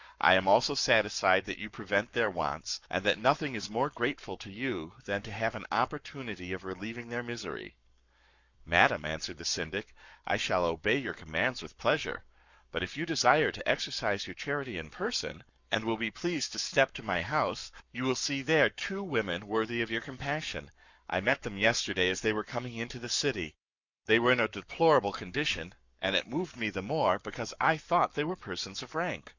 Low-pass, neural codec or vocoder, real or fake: 7.2 kHz; codec, 44.1 kHz, 7.8 kbps, Pupu-Codec; fake